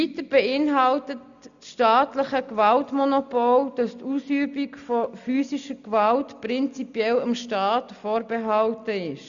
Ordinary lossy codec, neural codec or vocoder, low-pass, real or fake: none; none; 7.2 kHz; real